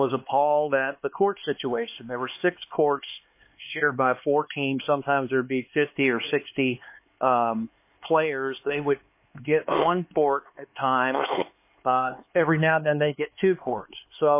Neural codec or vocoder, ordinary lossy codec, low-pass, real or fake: codec, 16 kHz, 4 kbps, X-Codec, HuBERT features, trained on LibriSpeech; MP3, 24 kbps; 3.6 kHz; fake